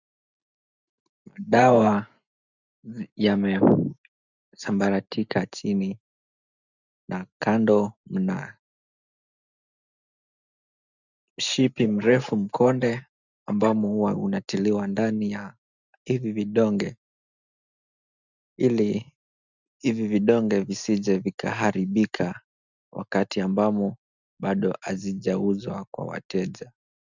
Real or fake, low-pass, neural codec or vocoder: real; 7.2 kHz; none